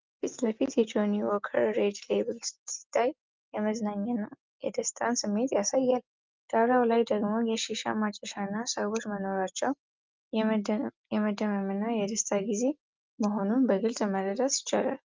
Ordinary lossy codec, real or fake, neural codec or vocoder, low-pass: Opus, 32 kbps; real; none; 7.2 kHz